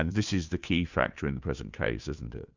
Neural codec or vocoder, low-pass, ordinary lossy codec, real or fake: codec, 16 kHz, 2 kbps, FunCodec, trained on Chinese and English, 25 frames a second; 7.2 kHz; Opus, 64 kbps; fake